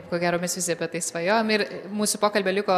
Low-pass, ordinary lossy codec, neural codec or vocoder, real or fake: 14.4 kHz; MP3, 96 kbps; none; real